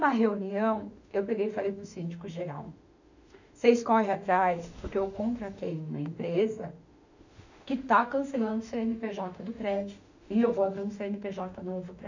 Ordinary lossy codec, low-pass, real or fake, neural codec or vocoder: none; 7.2 kHz; fake; autoencoder, 48 kHz, 32 numbers a frame, DAC-VAE, trained on Japanese speech